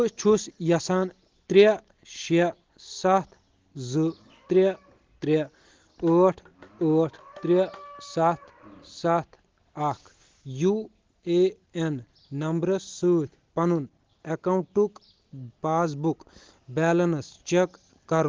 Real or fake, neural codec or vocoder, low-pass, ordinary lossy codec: real; none; 7.2 kHz; Opus, 16 kbps